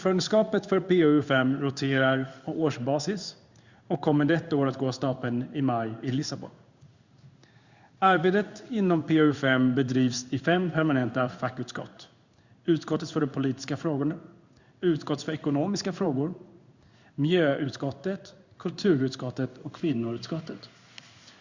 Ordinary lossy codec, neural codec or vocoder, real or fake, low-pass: Opus, 64 kbps; codec, 16 kHz in and 24 kHz out, 1 kbps, XY-Tokenizer; fake; 7.2 kHz